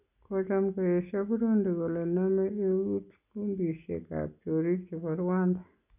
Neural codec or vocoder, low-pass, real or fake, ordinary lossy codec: none; 3.6 kHz; real; none